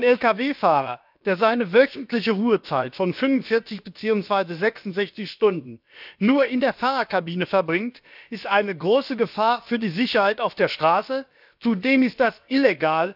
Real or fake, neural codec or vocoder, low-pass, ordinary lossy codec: fake; codec, 16 kHz, about 1 kbps, DyCAST, with the encoder's durations; 5.4 kHz; none